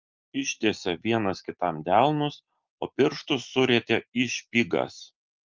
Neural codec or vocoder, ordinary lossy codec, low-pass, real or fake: none; Opus, 32 kbps; 7.2 kHz; real